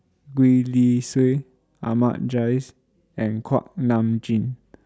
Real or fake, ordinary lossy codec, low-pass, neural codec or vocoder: real; none; none; none